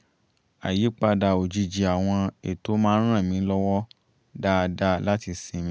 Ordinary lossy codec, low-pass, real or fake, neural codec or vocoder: none; none; real; none